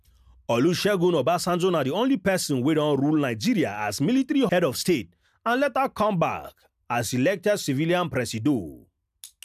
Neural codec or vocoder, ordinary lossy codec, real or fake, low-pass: none; none; real; 14.4 kHz